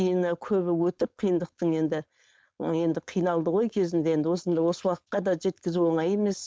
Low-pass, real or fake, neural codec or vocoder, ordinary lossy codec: none; fake; codec, 16 kHz, 4.8 kbps, FACodec; none